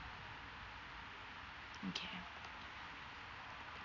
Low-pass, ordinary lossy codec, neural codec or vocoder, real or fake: 7.2 kHz; none; none; real